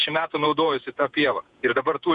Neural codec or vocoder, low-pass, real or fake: vocoder, 48 kHz, 128 mel bands, Vocos; 10.8 kHz; fake